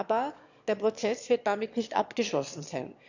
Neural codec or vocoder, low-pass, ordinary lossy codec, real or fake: autoencoder, 22.05 kHz, a latent of 192 numbers a frame, VITS, trained on one speaker; 7.2 kHz; none; fake